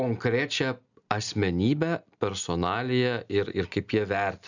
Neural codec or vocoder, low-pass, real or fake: none; 7.2 kHz; real